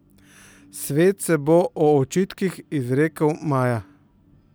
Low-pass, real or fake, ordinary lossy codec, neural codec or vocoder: none; real; none; none